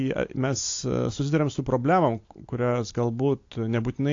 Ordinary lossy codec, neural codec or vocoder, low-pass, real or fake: AAC, 48 kbps; none; 7.2 kHz; real